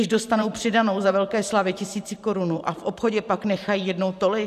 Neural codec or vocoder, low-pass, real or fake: vocoder, 44.1 kHz, 128 mel bands every 512 samples, BigVGAN v2; 14.4 kHz; fake